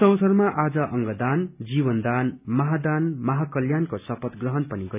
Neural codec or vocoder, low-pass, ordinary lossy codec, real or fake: none; 3.6 kHz; none; real